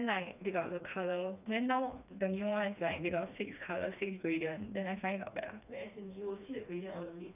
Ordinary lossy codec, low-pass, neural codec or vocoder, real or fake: none; 3.6 kHz; codec, 16 kHz, 2 kbps, FreqCodec, smaller model; fake